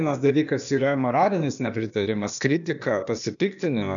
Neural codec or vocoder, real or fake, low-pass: codec, 16 kHz, 0.8 kbps, ZipCodec; fake; 7.2 kHz